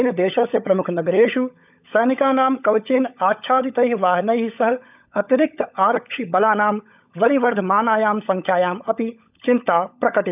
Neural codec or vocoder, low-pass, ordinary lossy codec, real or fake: codec, 16 kHz, 16 kbps, FunCodec, trained on LibriTTS, 50 frames a second; 3.6 kHz; none; fake